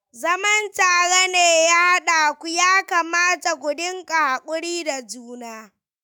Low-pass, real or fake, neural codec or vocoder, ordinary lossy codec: none; fake; autoencoder, 48 kHz, 128 numbers a frame, DAC-VAE, trained on Japanese speech; none